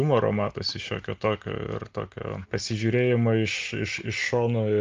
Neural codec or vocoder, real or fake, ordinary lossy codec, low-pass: none; real; Opus, 32 kbps; 7.2 kHz